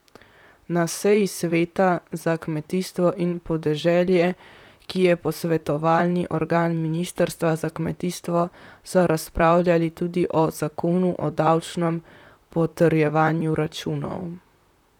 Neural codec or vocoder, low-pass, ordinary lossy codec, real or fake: vocoder, 44.1 kHz, 128 mel bands, Pupu-Vocoder; 19.8 kHz; none; fake